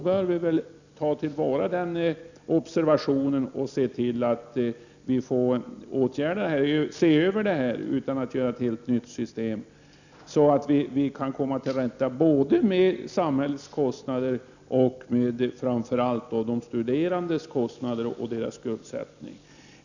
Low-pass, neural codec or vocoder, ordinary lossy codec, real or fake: 7.2 kHz; none; none; real